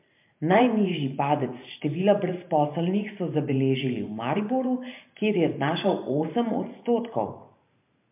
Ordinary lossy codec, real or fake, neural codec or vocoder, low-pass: MP3, 32 kbps; fake; vocoder, 44.1 kHz, 128 mel bands every 512 samples, BigVGAN v2; 3.6 kHz